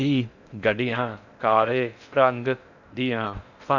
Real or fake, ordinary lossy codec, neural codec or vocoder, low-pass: fake; none; codec, 16 kHz in and 24 kHz out, 0.6 kbps, FocalCodec, streaming, 4096 codes; 7.2 kHz